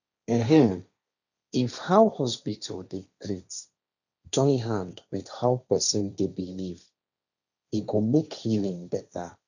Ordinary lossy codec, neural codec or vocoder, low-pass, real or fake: none; codec, 16 kHz, 1.1 kbps, Voila-Tokenizer; 7.2 kHz; fake